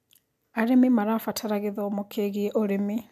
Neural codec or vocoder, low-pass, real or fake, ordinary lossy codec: none; 14.4 kHz; real; MP3, 96 kbps